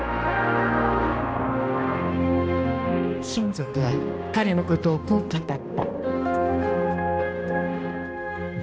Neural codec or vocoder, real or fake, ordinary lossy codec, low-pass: codec, 16 kHz, 1 kbps, X-Codec, HuBERT features, trained on balanced general audio; fake; none; none